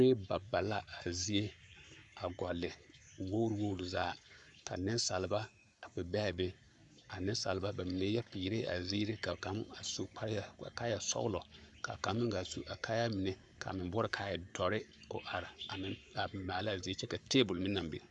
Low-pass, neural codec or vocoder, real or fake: 10.8 kHz; codec, 44.1 kHz, 7.8 kbps, Pupu-Codec; fake